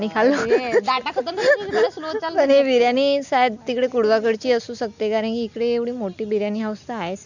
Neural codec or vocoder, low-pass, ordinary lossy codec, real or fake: none; 7.2 kHz; none; real